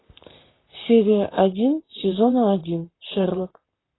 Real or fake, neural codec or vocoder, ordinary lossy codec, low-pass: fake; vocoder, 44.1 kHz, 128 mel bands, Pupu-Vocoder; AAC, 16 kbps; 7.2 kHz